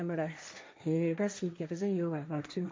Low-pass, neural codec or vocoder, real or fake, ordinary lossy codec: none; codec, 16 kHz, 1.1 kbps, Voila-Tokenizer; fake; none